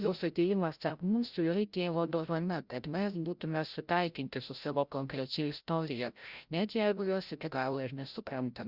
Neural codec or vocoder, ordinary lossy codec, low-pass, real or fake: codec, 16 kHz, 0.5 kbps, FreqCodec, larger model; Opus, 64 kbps; 5.4 kHz; fake